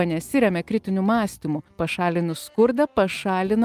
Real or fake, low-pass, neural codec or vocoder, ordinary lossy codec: real; 14.4 kHz; none; Opus, 32 kbps